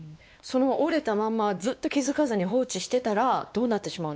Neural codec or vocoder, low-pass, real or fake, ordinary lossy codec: codec, 16 kHz, 2 kbps, X-Codec, WavLM features, trained on Multilingual LibriSpeech; none; fake; none